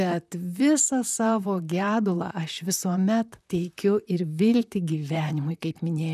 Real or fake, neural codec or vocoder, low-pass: fake; vocoder, 44.1 kHz, 128 mel bands, Pupu-Vocoder; 14.4 kHz